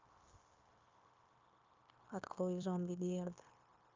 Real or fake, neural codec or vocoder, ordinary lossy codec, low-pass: fake; codec, 16 kHz, 0.9 kbps, LongCat-Audio-Codec; Opus, 24 kbps; 7.2 kHz